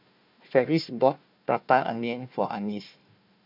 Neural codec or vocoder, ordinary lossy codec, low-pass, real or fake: codec, 16 kHz, 1 kbps, FunCodec, trained on Chinese and English, 50 frames a second; MP3, 48 kbps; 5.4 kHz; fake